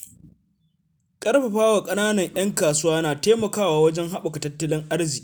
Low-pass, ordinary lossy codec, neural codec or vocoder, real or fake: none; none; none; real